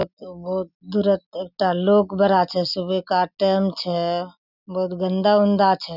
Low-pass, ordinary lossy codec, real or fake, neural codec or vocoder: 5.4 kHz; none; real; none